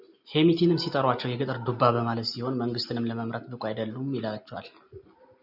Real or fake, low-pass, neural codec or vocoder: real; 5.4 kHz; none